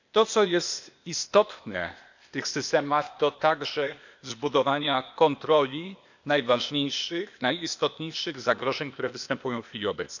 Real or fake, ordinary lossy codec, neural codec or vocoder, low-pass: fake; none; codec, 16 kHz, 0.8 kbps, ZipCodec; 7.2 kHz